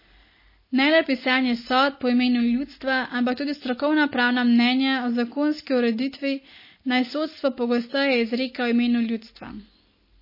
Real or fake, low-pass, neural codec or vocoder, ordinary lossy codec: real; 5.4 kHz; none; MP3, 24 kbps